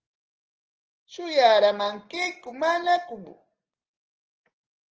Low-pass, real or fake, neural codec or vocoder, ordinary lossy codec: 7.2 kHz; real; none; Opus, 16 kbps